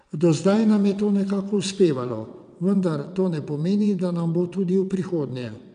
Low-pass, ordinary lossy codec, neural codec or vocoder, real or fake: 9.9 kHz; none; vocoder, 22.05 kHz, 80 mel bands, WaveNeXt; fake